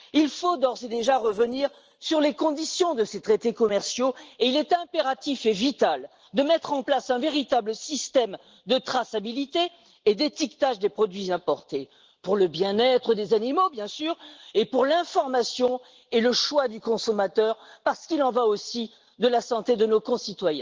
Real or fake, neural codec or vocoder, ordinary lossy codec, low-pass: real; none; Opus, 16 kbps; 7.2 kHz